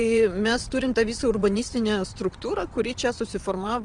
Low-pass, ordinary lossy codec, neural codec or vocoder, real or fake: 9.9 kHz; Opus, 24 kbps; vocoder, 22.05 kHz, 80 mel bands, WaveNeXt; fake